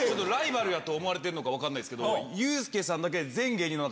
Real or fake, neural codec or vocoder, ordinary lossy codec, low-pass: real; none; none; none